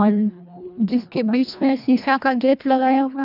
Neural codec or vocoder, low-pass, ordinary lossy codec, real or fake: codec, 24 kHz, 1.5 kbps, HILCodec; 5.4 kHz; none; fake